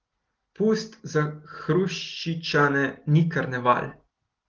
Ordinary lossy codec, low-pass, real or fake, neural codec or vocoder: Opus, 16 kbps; 7.2 kHz; real; none